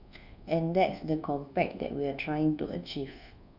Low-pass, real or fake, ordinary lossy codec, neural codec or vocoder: 5.4 kHz; fake; none; codec, 24 kHz, 1.2 kbps, DualCodec